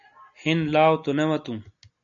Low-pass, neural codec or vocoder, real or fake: 7.2 kHz; none; real